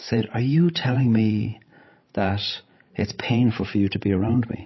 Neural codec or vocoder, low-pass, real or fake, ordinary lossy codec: codec, 16 kHz, 16 kbps, FreqCodec, larger model; 7.2 kHz; fake; MP3, 24 kbps